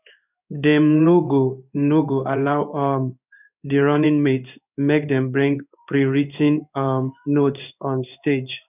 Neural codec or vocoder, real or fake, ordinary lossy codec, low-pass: codec, 16 kHz in and 24 kHz out, 1 kbps, XY-Tokenizer; fake; none; 3.6 kHz